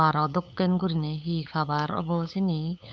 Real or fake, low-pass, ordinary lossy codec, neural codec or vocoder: fake; none; none; codec, 16 kHz, 8 kbps, FunCodec, trained on LibriTTS, 25 frames a second